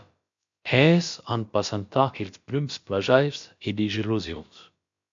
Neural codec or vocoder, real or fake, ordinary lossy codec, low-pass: codec, 16 kHz, about 1 kbps, DyCAST, with the encoder's durations; fake; MP3, 48 kbps; 7.2 kHz